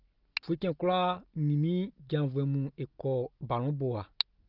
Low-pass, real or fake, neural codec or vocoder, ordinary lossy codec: 5.4 kHz; real; none; Opus, 24 kbps